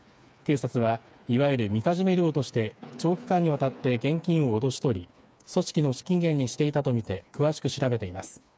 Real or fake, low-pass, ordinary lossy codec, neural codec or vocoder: fake; none; none; codec, 16 kHz, 4 kbps, FreqCodec, smaller model